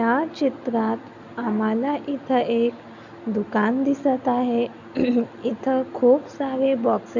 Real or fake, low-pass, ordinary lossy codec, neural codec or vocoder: real; 7.2 kHz; none; none